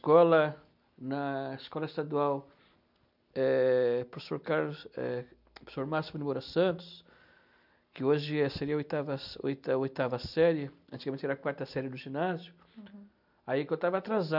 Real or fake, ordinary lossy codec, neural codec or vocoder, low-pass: real; none; none; 5.4 kHz